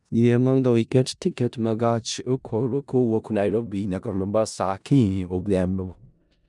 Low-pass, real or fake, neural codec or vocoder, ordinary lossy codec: 10.8 kHz; fake; codec, 16 kHz in and 24 kHz out, 0.4 kbps, LongCat-Audio-Codec, four codebook decoder; none